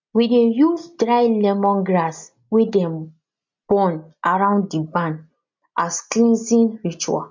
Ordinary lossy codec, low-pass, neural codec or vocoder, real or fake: MP3, 48 kbps; 7.2 kHz; none; real